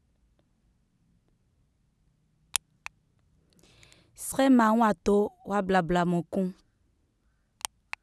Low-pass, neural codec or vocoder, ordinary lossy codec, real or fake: none; none; none; real